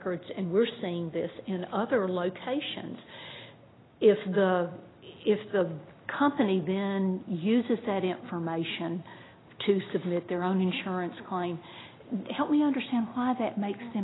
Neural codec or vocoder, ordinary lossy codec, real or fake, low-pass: none; AAC, 16 kbps; real; 7.2 kHz